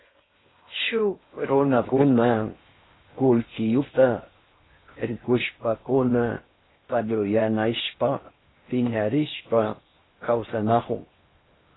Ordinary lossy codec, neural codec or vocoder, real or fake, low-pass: AAC, 16 kbps; codec, 16 kHz in and 24 kHz out, 0.6 kbps, FocalCodec, streaming, 4096 codes; fake; 7.2 kHz